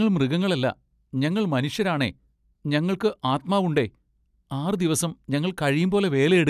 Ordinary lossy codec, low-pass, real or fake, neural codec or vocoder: none; 14.4 kHz; real; none